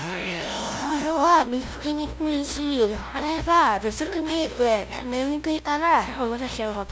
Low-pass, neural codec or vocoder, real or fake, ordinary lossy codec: none; codec, 16 kHz, 0.5 kbps, FunCodec, trained on LibriTTS, 25 frames a second; fake; none